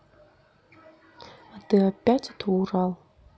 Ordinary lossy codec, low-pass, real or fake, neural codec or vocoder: none; none; real; none